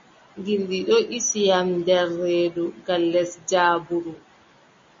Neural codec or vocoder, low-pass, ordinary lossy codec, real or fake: none; 7.2 kHz; MP3, 32 kbps; real